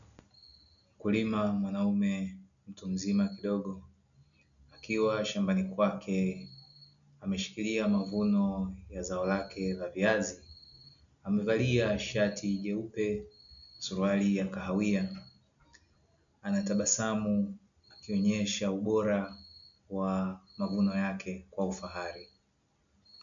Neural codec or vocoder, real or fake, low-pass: none; real; 7.2 kHz